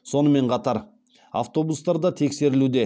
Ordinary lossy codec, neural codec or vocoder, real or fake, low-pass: none; none; real; none